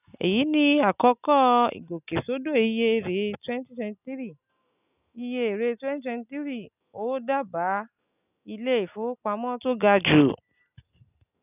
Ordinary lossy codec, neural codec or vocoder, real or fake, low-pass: none; none; real; 3.6 kHz